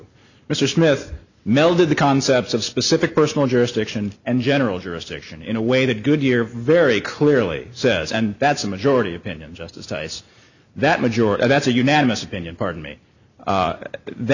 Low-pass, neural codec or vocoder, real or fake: 7.2 kHz; none; real